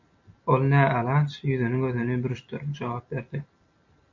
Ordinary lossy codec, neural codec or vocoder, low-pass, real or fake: MP3, 48 kbps; none; 7.2 kHz; real